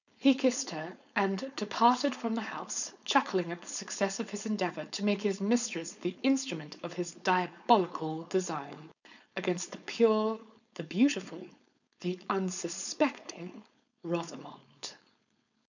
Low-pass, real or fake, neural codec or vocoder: 7.2 kHz; fake; codec, 16 kHz, 4.8 kbps, FACodec